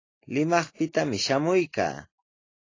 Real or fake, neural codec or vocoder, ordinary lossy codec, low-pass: real; none; AAC, 32 kbps; 7.2 kHz